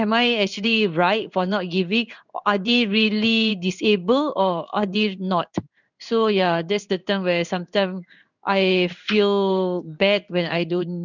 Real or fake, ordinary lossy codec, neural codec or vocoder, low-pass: fake; none; codec, 16 kHz in and 24 kHz out, 1 kbps, XY-Tokenizer; 7.2 kHz